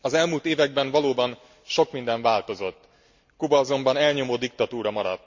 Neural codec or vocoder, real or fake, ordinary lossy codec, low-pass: none; real; MP3, 64 kbps; 7.2 kHz